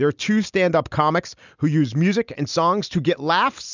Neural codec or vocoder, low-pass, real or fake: none; 7.2 kHz; real